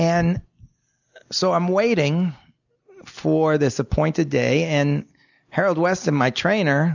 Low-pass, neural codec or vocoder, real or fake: 7.2 kHz; none; real